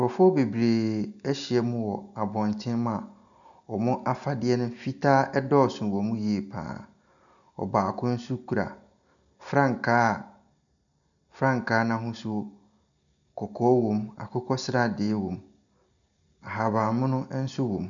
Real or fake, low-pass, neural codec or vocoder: real; 7.2 kHz; none